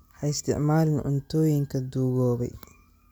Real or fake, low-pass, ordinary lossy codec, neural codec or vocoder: real; none; none; none